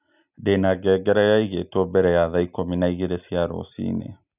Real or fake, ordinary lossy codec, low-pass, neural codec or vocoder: real; none; 3.6 kHz; none